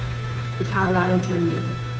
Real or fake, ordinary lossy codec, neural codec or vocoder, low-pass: fake; none; codec, 16 kHz, 2 kbps, FunCodec, trained on Chinese and English, 25 frames a second; none